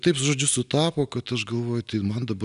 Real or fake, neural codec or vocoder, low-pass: real; none; 10.8 kHz